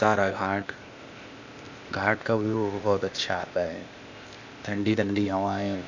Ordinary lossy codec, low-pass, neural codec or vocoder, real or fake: none; 7.2 kHz; codec, 16 kHz, 0.8 kbps, ZipCodec; fake